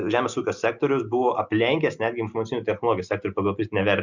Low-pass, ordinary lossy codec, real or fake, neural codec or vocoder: 7.2 kHz; Opus, 64 kbps; real; none